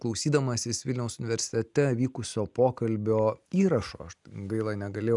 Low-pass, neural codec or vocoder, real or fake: 10.8 kHz; none; real